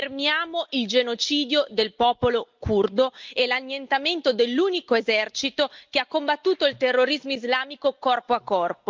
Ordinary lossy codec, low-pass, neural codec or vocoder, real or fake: Opus, 24 kbps; 7.2 kHz; none; real